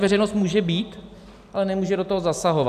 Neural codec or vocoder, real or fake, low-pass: none; real; 14.4 kHz